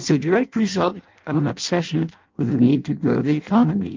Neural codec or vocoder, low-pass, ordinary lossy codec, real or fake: codec, 16 kHz in and 24 kHz out, 0.6 kbps, FireRedTTS-2 codec; 7.2 kHz; Opus, 24 kbps; fake